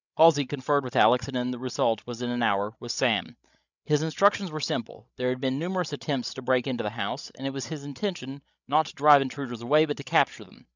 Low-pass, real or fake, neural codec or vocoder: 7.2 kHz; fake; codec, 16 kHz, 16 kbps, FreqCodec, larger model